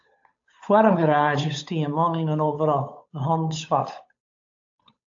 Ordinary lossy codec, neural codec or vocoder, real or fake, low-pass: AAC, 48 kbps; codec, 16 kHz, 8 kbps, FunCodec, trained on Chinese and English, 25 frames a second; fake; 7.2 kHz